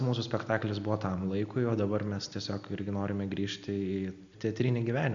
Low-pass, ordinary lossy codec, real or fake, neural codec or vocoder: 7.2 kHz; MP3, 64 kbps; real; none